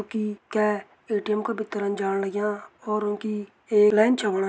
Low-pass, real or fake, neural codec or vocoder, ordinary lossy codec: none; real; none; none